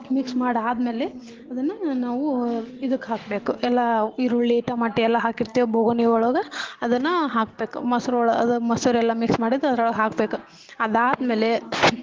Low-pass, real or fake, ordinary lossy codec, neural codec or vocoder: 7.2 kHz; real; Opus, 16 kbps; none